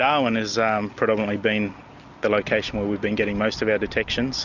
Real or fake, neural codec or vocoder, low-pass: real; none; 7.2 kHz